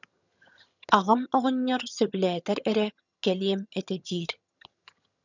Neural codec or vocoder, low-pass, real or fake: vocoder, 22.05 kHz, 80 mel bands, HiFi-GAN; 7.2 kHz; fake